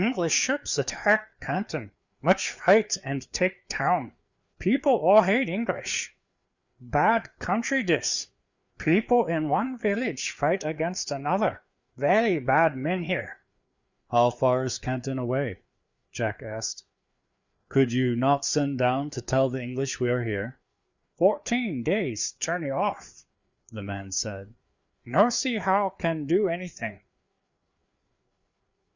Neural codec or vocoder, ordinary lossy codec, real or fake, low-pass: codec, 16 kHz, 4 kbps, FreqCodec, larger model; Opus, 64 kbps; fake; 7.2 kHz